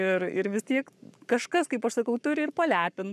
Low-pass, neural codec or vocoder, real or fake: 14.4 kHz; codec, 44.1 kHz, 7.8 kbps, Pupu-Codec; fake